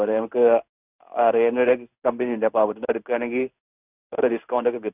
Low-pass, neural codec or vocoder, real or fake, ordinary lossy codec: 3.6 kHz; codec, 16 kHz in and 24 kHz out, 1 kbps, XY-Tokenizer; fake; none